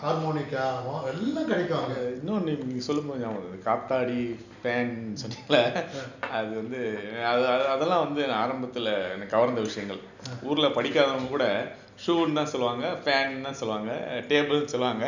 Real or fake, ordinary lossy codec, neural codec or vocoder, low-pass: real; none; none; 7.2 kHz